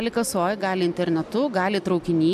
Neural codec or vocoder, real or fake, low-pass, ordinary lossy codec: none; real; 14.4 kHz; MP3, 96 kbps